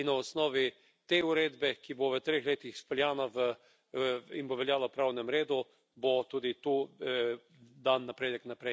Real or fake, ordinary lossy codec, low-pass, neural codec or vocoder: real; none; none; none